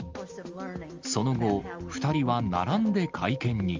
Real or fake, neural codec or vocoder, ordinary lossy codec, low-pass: real; none; Opus, 32 kbps; 7.2 kHz